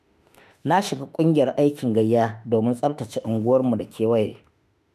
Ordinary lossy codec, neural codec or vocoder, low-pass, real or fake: none; autoencoder, 48 kHz, 32 numbers a frame, DAC-VAE, trained on Japanese speech; 14.4 kHz; fake